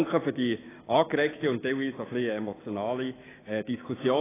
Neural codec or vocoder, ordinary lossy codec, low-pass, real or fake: codec, 16 kHz, 6 kbps, DAC; AAC, 16 kbps; 3.6 kHz; fake